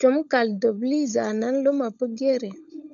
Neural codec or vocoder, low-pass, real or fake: codec, 16 kHz, 16 kbps, FunCodec, trained on LibriTTS, 50 frames a second; 7.2 kHz; fake